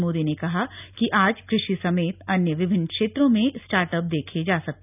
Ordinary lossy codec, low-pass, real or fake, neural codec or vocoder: none; 3.6 kHz; real; none